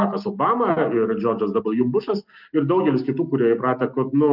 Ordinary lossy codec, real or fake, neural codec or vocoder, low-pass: Opus, 32 kbps; real; none; 5.4 kHz